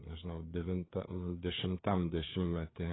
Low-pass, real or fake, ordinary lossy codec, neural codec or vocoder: 7.2 kHz; fake; AAC, 16 kbps; codec, 16 kHz, 4 kbps, FreqCodec, larger model